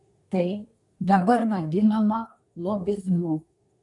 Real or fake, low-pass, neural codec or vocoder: fake; 10.8 kHz; codec, 24 kHz, 1 kbps, SNAC